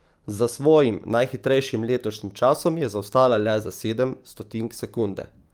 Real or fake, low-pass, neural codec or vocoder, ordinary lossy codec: fake; 19.8 kHz; codec, 44.1 kHz, 7.8 kbps, DAC; Opus, 32 kbps